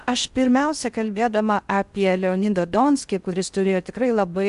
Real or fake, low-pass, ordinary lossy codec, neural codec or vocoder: fake; 10.8 kHz; Opus, 64 kbps; codec, 16 kHz in and 24 kHz out, 0.6 kbps, FocalCodec, streaming, 4096 codes